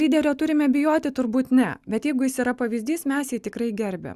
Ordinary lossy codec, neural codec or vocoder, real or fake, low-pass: Opus, 64 kbps; none; real; 14.4 kHz